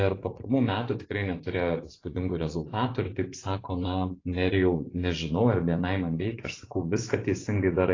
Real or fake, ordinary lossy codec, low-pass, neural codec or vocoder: fake; AAC, 32 kbps; 7.2 kHz; vocoder, 24 kHz, 100 mel bands, Vocos